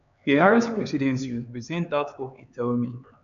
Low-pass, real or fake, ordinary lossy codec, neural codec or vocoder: 7.2 kHz; fake; none; codec, 16 kHz, 4 kbps, X-Codec, HuBERT features, trained on LibriSpeech